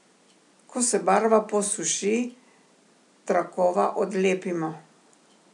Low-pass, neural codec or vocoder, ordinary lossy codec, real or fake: 10.8 kHz; none; none; real